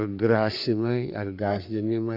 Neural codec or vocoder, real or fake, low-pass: codec, 44.1 kHz, 3.4 kbps, Pupu-Codec; fake; 5.4 kHz